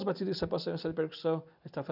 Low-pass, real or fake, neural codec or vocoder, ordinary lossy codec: 5.4 kHz; real; none; none